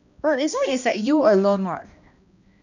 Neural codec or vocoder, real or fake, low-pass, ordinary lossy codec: codec, 16 kHz, 1 kbps, X-Codec, HuBERT features, trained on balanced general audio; fake; 7.2 kHz; none